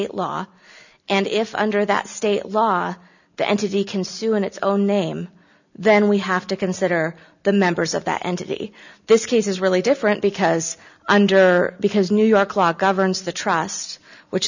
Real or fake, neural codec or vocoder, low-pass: real; none; 7.2 kHz